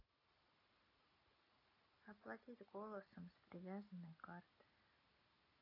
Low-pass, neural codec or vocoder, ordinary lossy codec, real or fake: 5.4 kHz; none; AAC, 32 kbps; real